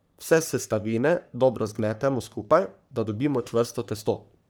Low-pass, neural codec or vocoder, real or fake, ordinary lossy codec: none; codec, 44.1 kHz, 3.4 kbps, Pupu-Codec; fake; none